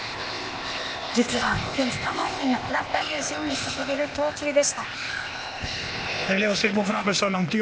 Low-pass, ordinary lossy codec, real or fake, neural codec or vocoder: none; none; fake; codec, 16 kHz, 0.8 kbps, ZipCodec